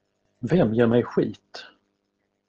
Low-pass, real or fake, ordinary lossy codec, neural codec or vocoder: 7.2 kHz; real; Opus, 16 kbps; none